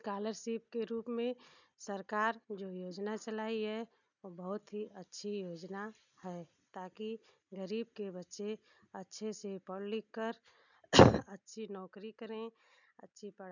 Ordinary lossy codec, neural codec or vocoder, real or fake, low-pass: none; none; real; 7.2 kHz